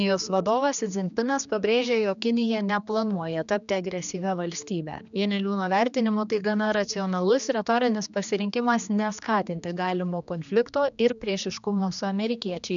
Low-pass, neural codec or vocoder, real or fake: 7.2 kHz; codec, 16 kHz, 2 kbps, X-Codec, HuBERT features, trained on general audio; fake